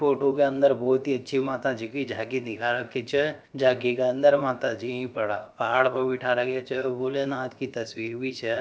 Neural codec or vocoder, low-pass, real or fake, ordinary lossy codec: codec, 16 kHz, about 1 kbps, DyCAST, with the encoder's durations; none; fake; none